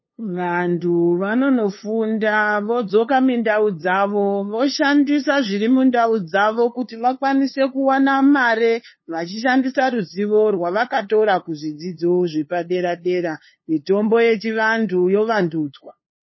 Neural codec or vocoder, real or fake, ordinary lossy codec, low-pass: codec, 16 kHz, 2 kbps, FunCodec, trained on LibriTTS, 25 frames a second; fake; MP3, 24 kbps; 7.2 kHz